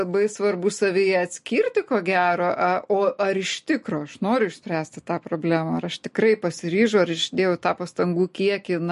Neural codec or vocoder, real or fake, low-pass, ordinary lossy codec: none; real; 14.4 kHz; MP3, 48 kbps